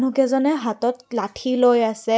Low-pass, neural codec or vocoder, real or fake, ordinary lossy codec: none; none; real; none